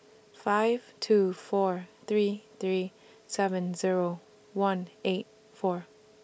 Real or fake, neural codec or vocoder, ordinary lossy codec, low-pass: real; none; none; none